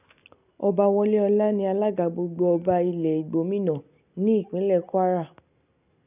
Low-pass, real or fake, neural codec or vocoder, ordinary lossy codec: 3.6 kHz; real; none; none